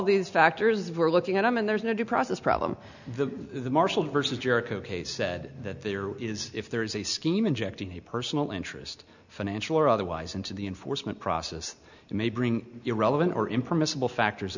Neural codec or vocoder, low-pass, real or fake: none; 7.2 kHz; real